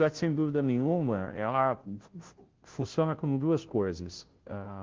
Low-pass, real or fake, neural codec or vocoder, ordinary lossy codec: 7.2 kHz; fake; codec, 16 kHz, 0.5 kbps, FunCodec, trained on Chinese and English, 25 frames a second; Opus, 16 kbps